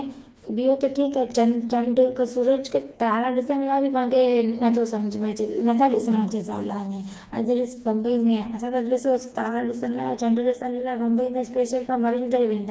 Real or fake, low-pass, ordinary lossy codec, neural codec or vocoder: fake; none; none; codec, 16 kHz, 2 kbps, FreqCodec, smaller model